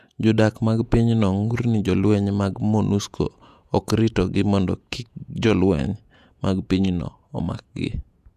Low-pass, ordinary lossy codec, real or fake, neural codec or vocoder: 14.4 kHz; none; fake; vocoder, 48 kHz, 128 mel bands, Vocos